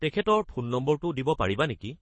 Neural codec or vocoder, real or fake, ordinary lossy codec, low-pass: codec, 24 kHz, 6 kbps, HILCodec; fake; MP3, 32 kbps; 9.9 kHz